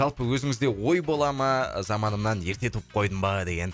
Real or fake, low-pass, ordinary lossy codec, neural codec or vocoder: real; none; none; none